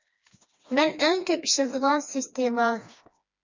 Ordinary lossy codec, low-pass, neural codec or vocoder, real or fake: MP3, 64 kbps; 7.2 kHz; codec, 16 kHz, 2 kbps, FreqCodec, smaller model; fake